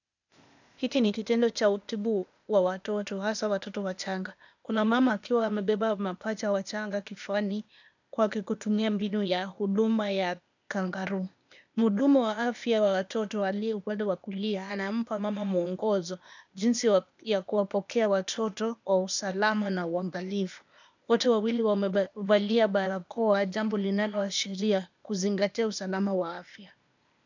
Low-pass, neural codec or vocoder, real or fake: 7.2 kHz; codec, 16 kHz, 0.8 kbps, ZipCodec; fake